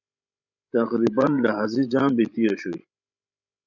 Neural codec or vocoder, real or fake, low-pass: codec, 16 kHz, 16 kbps, FreqCodec, larger model; fake; 7.2 kHz